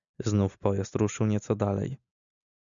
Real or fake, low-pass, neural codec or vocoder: real; 7.2 kHz; none